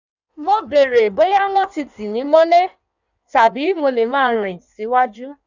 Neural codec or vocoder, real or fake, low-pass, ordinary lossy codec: codec, 16 kHz in and 24 kHz out, 1.1 kbps, FireRedTTS-2 codec; fake; 7.2 kHz; none